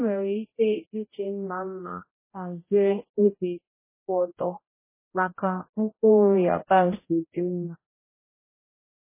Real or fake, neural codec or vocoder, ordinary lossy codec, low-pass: fake; codec, 16 kHz, 0.5 kbps, X-Codec, HuBERT features, trained on general audio; MP3, 16 kbps; 3.6 kHz